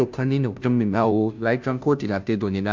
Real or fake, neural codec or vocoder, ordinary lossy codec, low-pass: fake; codec, 16 kHz, 0.5 kbps, FunCodec, trained on Chinese and English, 25 frames a second; MP3, 64 kbps; 7.2 kHz